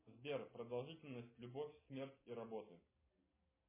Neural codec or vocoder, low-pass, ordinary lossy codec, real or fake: none; 3.6 kHz; MP3, 16 kbps; real